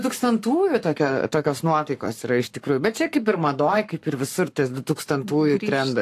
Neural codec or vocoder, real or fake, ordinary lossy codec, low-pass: codec, 44.1 kHz, 7.8 kbps, Pupu-Codec; fake; AAC, 64 kbps; 14.4 kHz